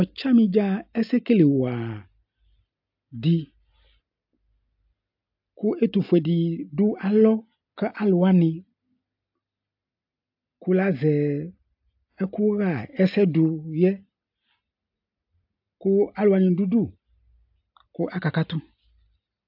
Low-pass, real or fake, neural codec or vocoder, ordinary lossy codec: 5.4 kHz; real; none; AAC, 48 kbps